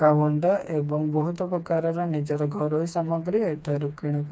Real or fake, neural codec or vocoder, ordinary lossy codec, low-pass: fake; codec, 16 kHz, 2 kbps, FreqCodec, smaller model; none; none